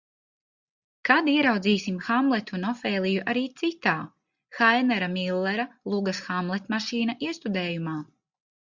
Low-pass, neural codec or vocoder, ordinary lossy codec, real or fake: 7.2 kHz; none; Opus, 64 kbps; real